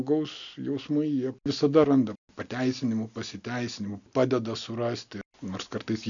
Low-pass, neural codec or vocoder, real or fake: 7.2 kHz; none; real